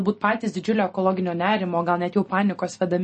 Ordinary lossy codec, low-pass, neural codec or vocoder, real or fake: MP3, 32 kbps; 10.8 kHz; none; real